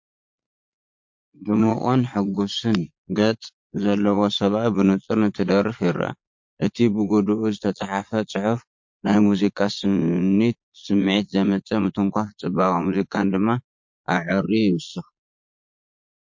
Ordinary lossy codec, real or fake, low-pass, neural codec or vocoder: MP3, 48 kbps; fake; 7.2 kHz; vocoder, 22.05 kHz, 80 mel bands, Vocos